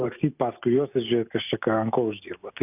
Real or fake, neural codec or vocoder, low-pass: real; none; 3.6 kHz